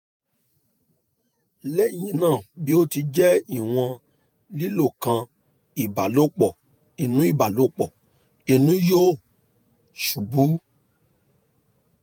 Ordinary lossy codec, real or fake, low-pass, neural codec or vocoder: none; fake; none; vocoder, 48 kHz, 128 mel bands, Vocos